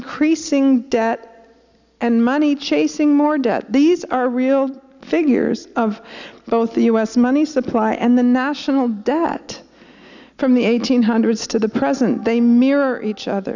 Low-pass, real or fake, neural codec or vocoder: 7.2 kHz; real; none